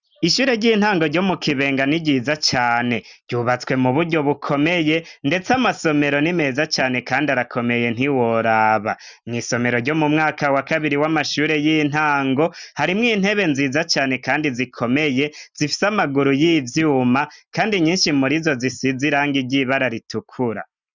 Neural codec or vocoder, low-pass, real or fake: none; 7.2 kHz; real